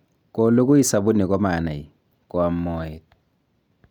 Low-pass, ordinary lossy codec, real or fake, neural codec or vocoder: 19.8 kHz; none; real; none